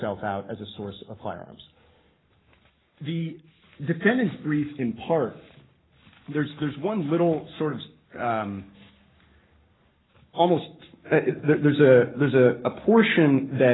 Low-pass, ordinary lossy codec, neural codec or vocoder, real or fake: 7.2 kHz; AAC, 16 kbps; codec, 44.1 kHz, 7.8 kbps, Pupu-Codec; fake